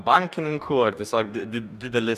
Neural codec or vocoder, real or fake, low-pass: codec, 44.1 kHz, 2.6 kbps, DAC; fake; 14.4 kHz